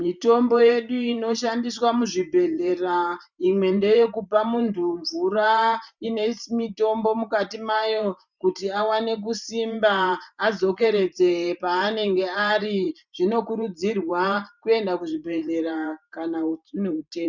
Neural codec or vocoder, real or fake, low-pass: vocoder, 44.1 kHz, 128 mel bands every 512 samples, BigVGAN v2; fake; 7.2 kHz